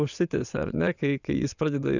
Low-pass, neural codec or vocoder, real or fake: 7.2 kHz; vocoder, 22.05 kHz, 80 mel bands, WaveNeXt; fake